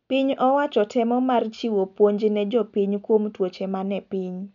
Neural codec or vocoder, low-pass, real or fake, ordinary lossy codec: none; 7.2 kHz; real; none